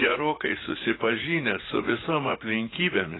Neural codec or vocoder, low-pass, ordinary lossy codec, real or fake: vocoder, 44.1 kHz, 80 mel bands, Vocos; 7.2 kHz; AAC, 16 kbps; fake